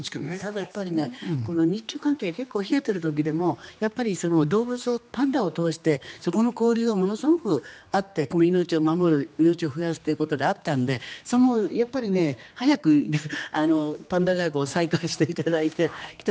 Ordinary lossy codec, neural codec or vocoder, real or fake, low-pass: none; codec, 16 kHz, 2 kbps, X-Codec, HuBERT features, trained on general audio; fake; none